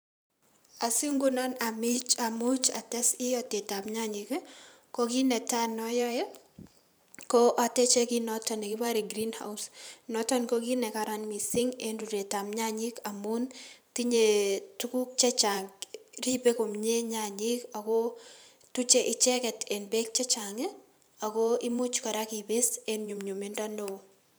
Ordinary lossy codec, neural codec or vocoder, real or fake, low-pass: none; vocoder, 44.1 kHz, 128 mel bands every 512 samples, BigVGAN v2; fake; none